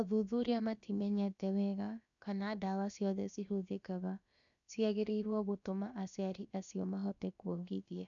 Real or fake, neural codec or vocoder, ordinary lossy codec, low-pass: fake; codec, 16 kHz, about 1 kbps, DyCAST, with the encoder's durations; none; 7.2 kHz